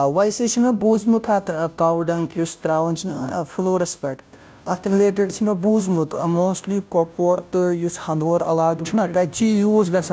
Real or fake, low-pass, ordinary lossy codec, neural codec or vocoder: fake; none; none; codec, 16 kHz, 0.5 kbps, FunCodec, trained on Chinese and English, 25 frames a second